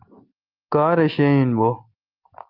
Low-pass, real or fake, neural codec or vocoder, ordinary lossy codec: 5.4 kHz; real; none; Opus, 24 kbps